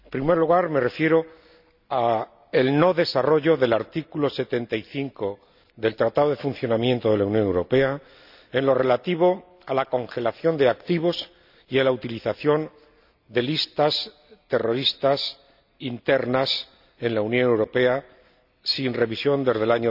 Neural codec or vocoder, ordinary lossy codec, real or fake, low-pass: none; none; real; 5.4 kHz